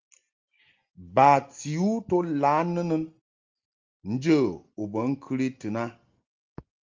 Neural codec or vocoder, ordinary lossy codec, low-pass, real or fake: none; Opus, 32 kbps; 7.2 kHz; real